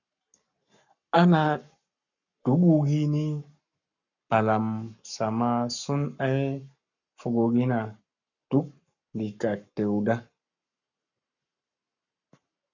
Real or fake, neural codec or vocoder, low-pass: fake; codec, 44.1 kHz, 7.8 kbps, Pupu-Codec; 7.2 kHz